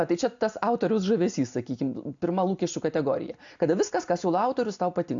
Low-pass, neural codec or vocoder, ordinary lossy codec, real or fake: 7.2 kHz; none; AAC, 64 kbps; real